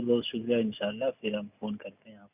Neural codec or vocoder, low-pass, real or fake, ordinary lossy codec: none; 3.6 kHz; real; none